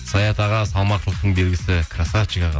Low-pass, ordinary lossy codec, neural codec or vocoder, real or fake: none; none; none; real